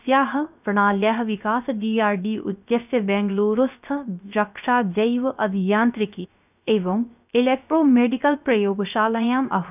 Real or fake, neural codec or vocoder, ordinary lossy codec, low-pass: fake; codec, 16 kHz, 0.3 kbps, FocalCodec; none; 3.6 kHz